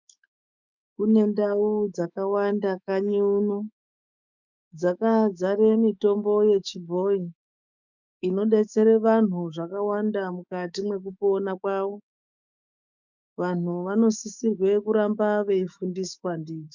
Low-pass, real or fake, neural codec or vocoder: 7.2 kHz; fake; autoencoder, 48 kHz, 128 numbers a frame, DAC-VAE, trained on Japanese speech